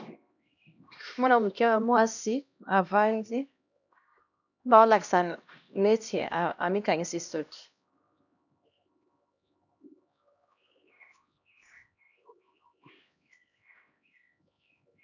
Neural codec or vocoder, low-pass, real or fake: codec, 16 kHz, 1 kbps, X-Codec, HuBERT features, trained on LibriSpeech; 7.2 kHz; fake